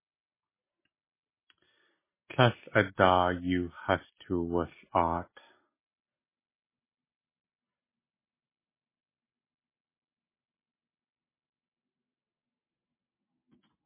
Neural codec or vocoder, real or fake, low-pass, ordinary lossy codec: none; real; 3.6 kHz; MP3, 16 kbps